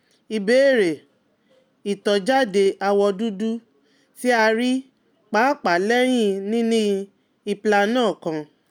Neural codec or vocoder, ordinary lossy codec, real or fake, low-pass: none; none; real; 19.8 kHz